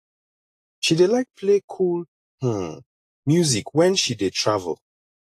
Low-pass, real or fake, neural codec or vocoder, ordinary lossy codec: 14.4 kHz; real; none; AAC, 48 kbps